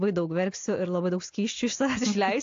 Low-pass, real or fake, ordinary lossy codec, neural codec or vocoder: 7.2 kHz; real; AAC, 48 kbps; none